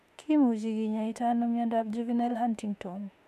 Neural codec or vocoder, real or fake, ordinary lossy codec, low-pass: autoencoder, 48 kHz, 32 numbers a frame, DAC-VAE, trained on Japanese speech; fake; none; 14.4 kHz